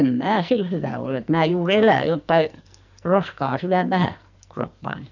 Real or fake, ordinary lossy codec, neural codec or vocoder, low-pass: fake; none; codec, 44.1 kHz, 2.6 kbps, SNAC; 7.2 kHz